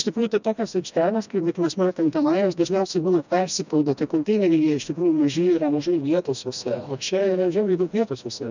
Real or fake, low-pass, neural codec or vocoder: fake; 7.2 kHz; codec, 16 kHz, 1 kbps, FreqCodec, smaller model